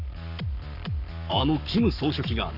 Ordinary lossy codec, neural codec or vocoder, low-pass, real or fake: none; codec, 44.1 kHz, 7.8 kbps, Pupu-Codec; 5.4 kHz; fake